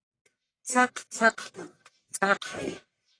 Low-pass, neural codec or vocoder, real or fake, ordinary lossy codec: 9.9 kHz; codec, 44.1 kHz, 1.7 kbps, Pupu-Codec; fake; AAC, 32 kbps